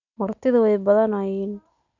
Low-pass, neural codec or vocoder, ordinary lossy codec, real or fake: 7.2 kHz; none; none; real